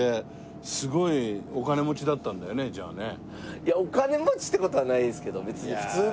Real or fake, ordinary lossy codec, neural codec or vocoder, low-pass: real; none; none; none